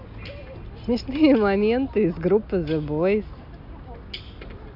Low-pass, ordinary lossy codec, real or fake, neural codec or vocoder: 5.4 kHz; none; real; none